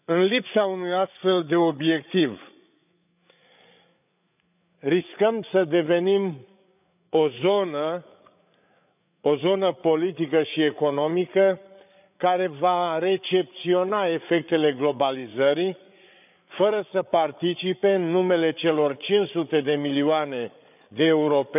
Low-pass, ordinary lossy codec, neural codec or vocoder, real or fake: 3.6 kHz; none; codec, 16 kHz, 8 kbps, FreqCodec, larger model; fake